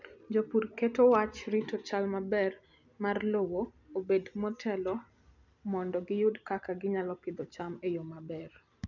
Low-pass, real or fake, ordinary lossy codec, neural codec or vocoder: 7.2 kHz; real; none; none